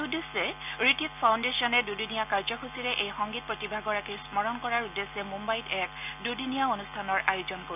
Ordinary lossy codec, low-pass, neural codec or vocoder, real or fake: none; 3.6 kHz; none; real